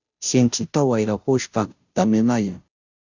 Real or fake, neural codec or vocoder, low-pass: fake; codec, 16 kHz, 0.5 kbps, FunCodec, trained on Chinese and English, 25 frames a second; 7.2 kHz